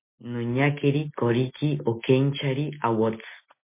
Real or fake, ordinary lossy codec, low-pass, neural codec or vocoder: real; MP3, 24 kbps; 3.6 kHz; none